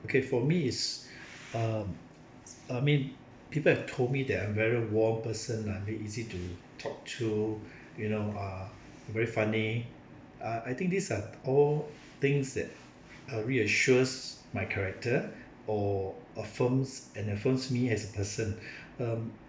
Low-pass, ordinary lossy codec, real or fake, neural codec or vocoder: none; none; real; none